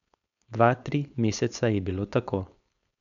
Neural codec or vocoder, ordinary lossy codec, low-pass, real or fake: codec, 16 kHz, 4.8 kbps, FACodec; none; 7.2 kHz; fake